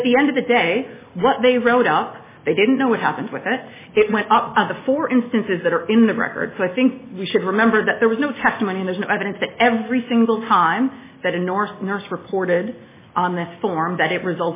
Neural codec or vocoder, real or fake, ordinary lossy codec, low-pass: none; real; MP3, 16 kbps; 3.6 kHz